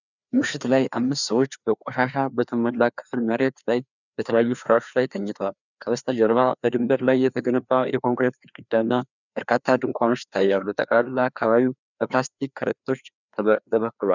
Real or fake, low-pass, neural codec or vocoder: fake; 7.2 kHz; codec, 16 kHz, 2 kbps, FreqCodec, larger model